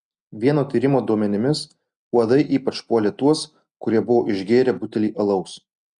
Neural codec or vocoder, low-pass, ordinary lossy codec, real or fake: none; 10.8 kHz; Opus, 64 kbps; real